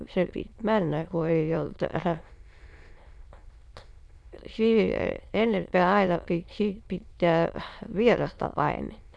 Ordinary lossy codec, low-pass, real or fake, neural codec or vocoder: none; none; fake; autoencoder, 22.05 kHz, a latent of 192 numbers a frame, VITS, trained on many speakers